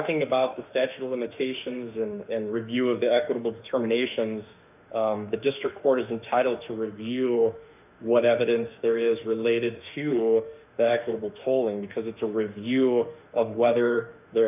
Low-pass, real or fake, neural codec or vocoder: 3.6 kHz; fake; autoencoder, 48 kHz, 32 numbers a frame, DAC-VAE, trained on Japanese speech